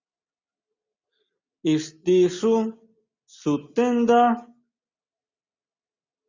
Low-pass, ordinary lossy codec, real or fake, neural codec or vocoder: 7.2 kHz; Opus, 64 kbps; real; none